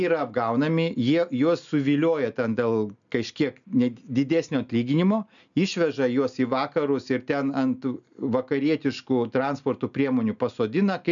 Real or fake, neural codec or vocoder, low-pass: real; none; 7.2 kHz